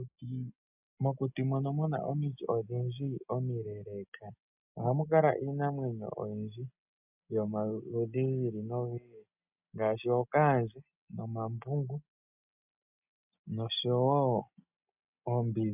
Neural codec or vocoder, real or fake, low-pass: none; real; 3.6 kHz